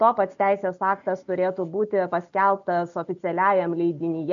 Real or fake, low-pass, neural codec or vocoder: real; 7.2 kHz; none